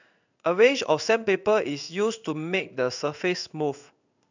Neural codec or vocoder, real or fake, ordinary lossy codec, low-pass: codec, 16 kHz in and 24 kHz out, 1 kbps, XY-Tokenizer; fake; none; 7.2 kHz